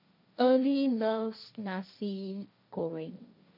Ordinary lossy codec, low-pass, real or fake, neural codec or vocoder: none; 5.4 kHz; fake; codec, 16 kHz, 1.1 kbps, Voila-Tokenizer